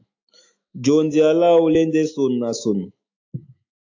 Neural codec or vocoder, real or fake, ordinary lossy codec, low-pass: autoencoder, 48 kHz, 128 numbers a frame, DAC-VAE, trained on Japanese speech; fake; AAC, 48 kbps; 7.2 kHz